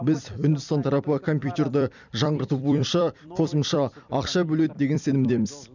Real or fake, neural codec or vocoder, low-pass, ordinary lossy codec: fake; vocoder, 44.1 kHz, 128 mel bands every 256 samples, BigVGAN v2; 7.2 kHz; none